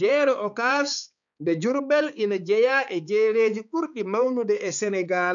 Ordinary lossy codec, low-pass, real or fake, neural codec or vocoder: none; 7.2 kHz; fake; codec, 16 kHz, 4 kbps, X-Codec, HuBERT features, trained on balanced general audio